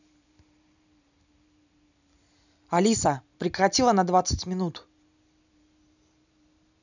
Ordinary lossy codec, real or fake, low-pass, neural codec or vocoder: none; real; 7.2 kHz; none